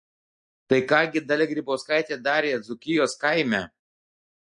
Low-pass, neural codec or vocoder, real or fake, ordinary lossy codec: 9.9 kHz; none; real; MP3, 48 kbps